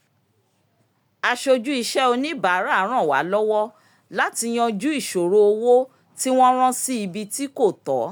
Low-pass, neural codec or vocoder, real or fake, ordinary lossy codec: none; none; real; none